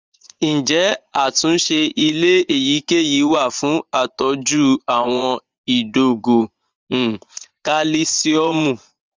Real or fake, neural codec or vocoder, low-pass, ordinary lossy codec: fake; vocoder, 24 kHz, 100 mel bands, Vocos; 7.2 kHz; Opus, 32 kbps